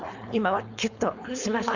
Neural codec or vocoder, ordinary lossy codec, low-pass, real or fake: codec, 16 kHz, 4.8 kbps, FACodec; none; 7.2 kHz; fake